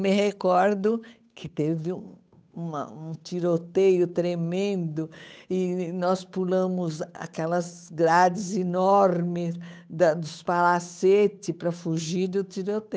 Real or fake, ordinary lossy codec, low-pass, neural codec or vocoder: fake; none; none; codec, 16 kHz, 8 kbps, FunCodec, trained on Chinese and English, 25 frames a second